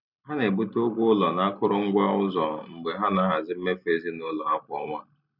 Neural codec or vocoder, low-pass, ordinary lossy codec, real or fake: none; 5.4 kHz; none; real